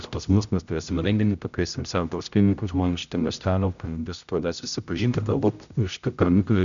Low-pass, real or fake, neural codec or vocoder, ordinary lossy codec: 7.2 kHz; fake; codec, 16 kHz, 0.5 kbps, X-Codec, HuBERT features, trained on general audio; MP3, 96 kbps